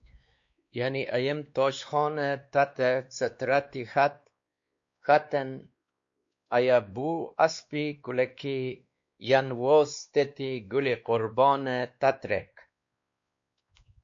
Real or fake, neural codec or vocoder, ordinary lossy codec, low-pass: fake; codec, 16 kHz, 2 kbps, X-Codec, WavLM features, trained on Multilingual LibriSpeech; MP3, 48 kbps; 7.2 kHz